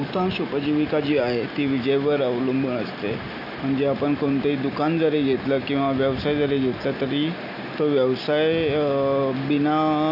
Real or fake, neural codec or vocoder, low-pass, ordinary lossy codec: real; none; 5.4 kHz; none